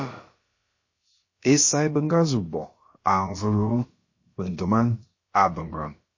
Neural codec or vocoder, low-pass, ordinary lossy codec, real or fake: codec, 16 kHz, about 1 kbps, DyCAST, with the encoder's durations; 7.2 kHz; MP3, 32 kbps; fake